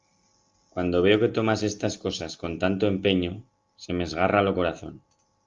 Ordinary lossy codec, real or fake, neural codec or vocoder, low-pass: Opus, 32 kbps; real; none; 7.2 kHz